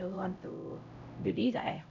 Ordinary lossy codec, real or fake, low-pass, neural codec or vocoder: none; fake; 7.2 kHz; codec, 16 kHz, 0.5 kbps, X-Codec, HuBERT features, trained on LibriSpeech